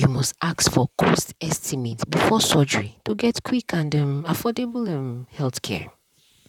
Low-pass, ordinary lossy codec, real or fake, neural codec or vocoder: 19.8 kHz; none; fake; vocoder, 44.1 kHz, 128 mel bands, Pupu-Vocoder